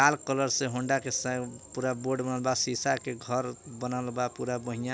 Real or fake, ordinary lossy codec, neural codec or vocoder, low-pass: real; none; none; none